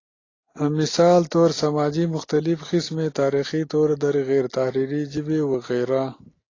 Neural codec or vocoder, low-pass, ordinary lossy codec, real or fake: none; 7.2 kHz; AAC, 32 kbps; real